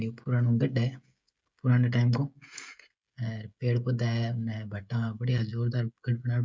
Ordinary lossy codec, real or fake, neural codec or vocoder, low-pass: none; fake; codec, 16 kHz, 16 kbps, FreqCodec, smaller model; none